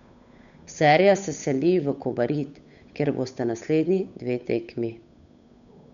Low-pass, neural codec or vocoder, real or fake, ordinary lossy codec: 7.2 kHz; codec, 16 kHz, 8 kbps, FunCodec, trained on Chinese and English, 25 frames a second; fake; none